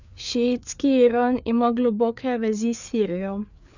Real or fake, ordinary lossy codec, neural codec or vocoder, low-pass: fake; none; codec, 16 kHz, 4 kbps, FreqCodec, larger model; 7.2 kHz